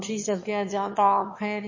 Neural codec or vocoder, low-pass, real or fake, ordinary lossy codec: autoencoder, 22.05 kHz, a latent of 192 numbers a frame, VITS, trained on one speaker; 7.2 kHz; fake; MP3, 32 kbps